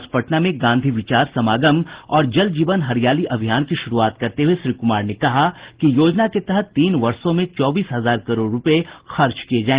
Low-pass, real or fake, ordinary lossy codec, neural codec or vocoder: 3.6 kHz; real; Opus, 16 kbps; none